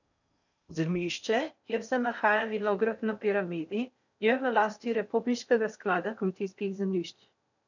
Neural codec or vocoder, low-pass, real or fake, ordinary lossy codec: codec, 16 kHz in and 24 kHz out, 0.6 kbps, FocalCodec, streaming, 4096 codes; 7.2 kHz; fake; none